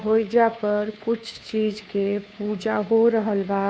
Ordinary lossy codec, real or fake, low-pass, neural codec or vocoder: none; fake; none; codec, 16 kHz, 2 kbps, FunCodec, trained on Chinese and English, 25 frames a second